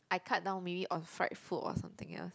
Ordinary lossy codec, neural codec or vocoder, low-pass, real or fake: none; none; none; real